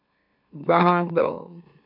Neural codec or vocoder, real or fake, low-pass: autoencoder, 44.1 kHz, a latent of 192 numbers a frame, MeloTTS; fake; 5.4 kHz